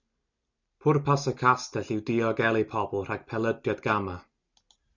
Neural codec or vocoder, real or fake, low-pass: none; real; 7.2 kHz